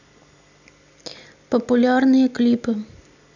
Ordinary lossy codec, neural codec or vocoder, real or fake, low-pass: none; none; real; 7.2 kHz